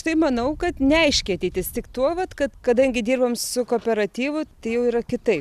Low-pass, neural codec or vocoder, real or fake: 14.4 kHz; none; real